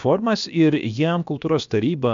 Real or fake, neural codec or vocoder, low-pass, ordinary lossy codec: fake; codec, 16 kHz, about 1 kbps, DyCAST, with the encoder's durations; 7.2 kHz; MP3, 64 kbps